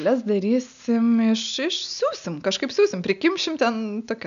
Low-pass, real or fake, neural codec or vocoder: 7.2 kHz; real; none